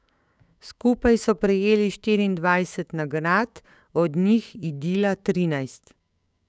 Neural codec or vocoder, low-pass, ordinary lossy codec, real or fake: codec, 16 kHz, 6 kbps, DAC; none; none; fake